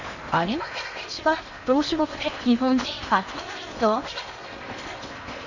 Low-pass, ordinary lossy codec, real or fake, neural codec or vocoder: 7.2 kHz; none; fake; codec, 16 kHz in and 24 kHz out, 0.8 kbps, FocalCodec, streaming, 65536 codes